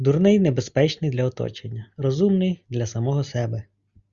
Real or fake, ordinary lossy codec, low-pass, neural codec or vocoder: real; Opus, 64 kbps; 7.2 kHz; none